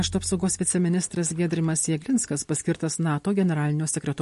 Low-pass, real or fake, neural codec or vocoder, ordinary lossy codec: 14.4 kHz; real; none; MP3, 48 kbps